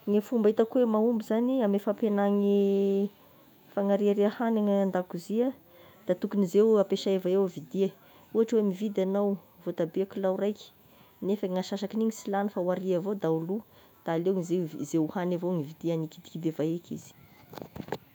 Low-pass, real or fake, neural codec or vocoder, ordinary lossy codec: 19.8 kHz; fake; autoencoder, 48 kHz, 128 numbers a frame, DAC-VAE, trained on Japanese speech; none